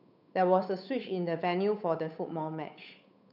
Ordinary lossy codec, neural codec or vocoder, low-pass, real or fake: none; codec, 16 kHz, 8 kbps, FunCodec, trained on Chinese and English, 25 frames a second; 5.4 kHz; fake